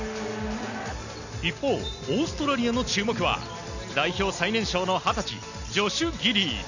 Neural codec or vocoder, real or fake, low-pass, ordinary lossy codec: none; real; 7.2 kHz; none